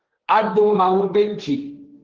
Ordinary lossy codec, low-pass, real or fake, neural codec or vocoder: Opus, 16 kbps; 7.2 kHz; fake; codec, 16 kHz, 1.1 kbps, Voila-Tokenizer